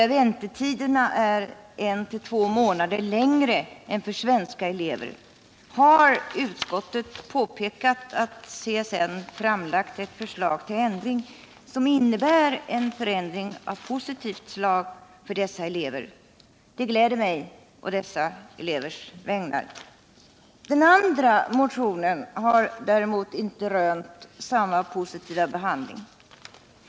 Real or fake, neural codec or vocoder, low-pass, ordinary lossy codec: real; none; none; none